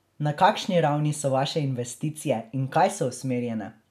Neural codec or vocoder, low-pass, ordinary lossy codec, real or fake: none; 14.4 kHz; none; real